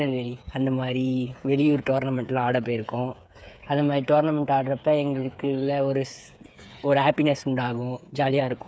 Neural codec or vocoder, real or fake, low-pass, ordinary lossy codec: codec, 16 kHz, 8 kbps, FreqCodec, smaller model; fake; none; none